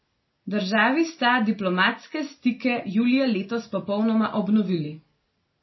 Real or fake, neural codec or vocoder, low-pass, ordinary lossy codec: real; none; 7.2 kHz; MP3, 24 kbps